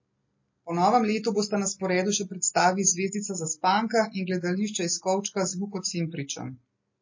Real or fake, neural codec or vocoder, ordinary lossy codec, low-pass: real; none; MP3, 32 kbps; 7.2 kHz